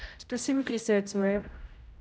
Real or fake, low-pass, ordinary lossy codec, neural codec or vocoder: fake; none; none; codec, 16 kHz, 0.5 kbps, X-Codec, HuBERT features, trained on general audio